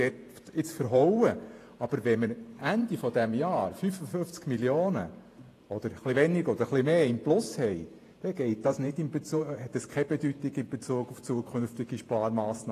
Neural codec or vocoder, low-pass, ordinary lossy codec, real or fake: vocoder, 48 kHz, 128 mel bands, Vocos; 14.4 kHz; AAC, 48 kbps; fake